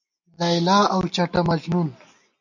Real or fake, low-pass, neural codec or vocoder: real; 7.2 kHz; none